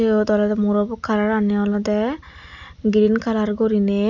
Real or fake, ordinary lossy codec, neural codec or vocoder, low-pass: real; none; none; 7.2 kHz